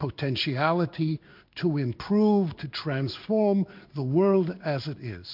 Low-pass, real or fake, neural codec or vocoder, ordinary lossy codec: 5.4 kHz; fake; codec, 16 kHz in and 24 kHz out, 1 kbps, XY-Tokenizer; MP3, 48 kbps